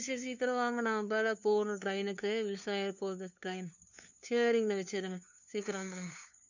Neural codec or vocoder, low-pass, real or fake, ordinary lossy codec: codec, 16 kHz, 2 kbps, FunCodec, trained on LibriTTS, 25 frames a second; 7.2 kHz; fake; none